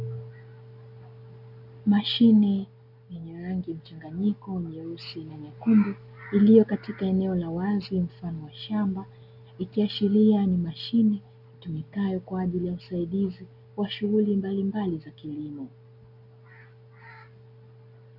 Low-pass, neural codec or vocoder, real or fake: 5.4 kHz; none; real